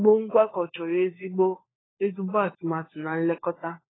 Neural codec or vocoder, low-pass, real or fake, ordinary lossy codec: codec, 16 kHz, 4 kbps, FunCodec, trained on LibriTTS, 50 frames a second; 7.2 kHz; fake; AAC, 16 kbps